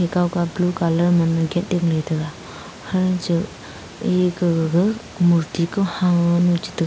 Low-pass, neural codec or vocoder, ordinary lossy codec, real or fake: none; none; none; real